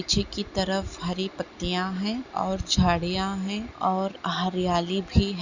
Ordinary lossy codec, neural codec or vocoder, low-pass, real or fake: Opus, 64 kbps; none; 7.2 kHz; real